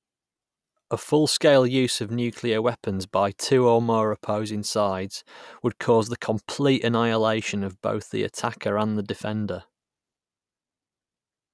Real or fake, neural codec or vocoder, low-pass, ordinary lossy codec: real; none; none; none